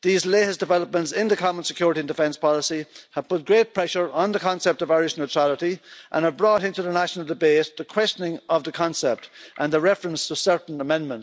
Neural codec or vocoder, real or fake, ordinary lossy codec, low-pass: none; real; none; none